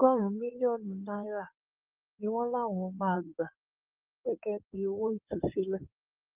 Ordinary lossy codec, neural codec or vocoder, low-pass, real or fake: Opus, 32 kbps; codec, 16 kHz in and 24 kHz out, 2.2 kbps, FireRedTTS-2 codec; 3.6 kHz; fake